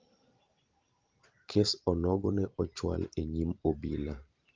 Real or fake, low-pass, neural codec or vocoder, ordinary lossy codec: fake; 7.2 kHz; vocoder, 44.1 kHz, 128 mel bands every 512 samples, BigVGAN v2; Opus, 32 kbps